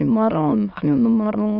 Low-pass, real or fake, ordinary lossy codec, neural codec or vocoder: 5.4 kHz; fake; none; autoencoder, 22.05 kHz, a latent of 192 numbers a frame, VITS, trained on many speakers